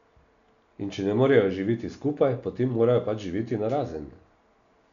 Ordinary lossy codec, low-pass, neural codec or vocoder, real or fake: none; 7.2 kHz; none; real